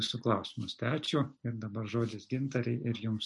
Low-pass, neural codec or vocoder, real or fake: 10.8 kHz; none; real